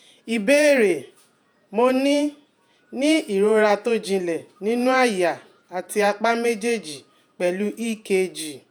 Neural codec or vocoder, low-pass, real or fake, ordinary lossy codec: vocoder, 48 kHz, 128 mel bands, Vocos; none; fake; none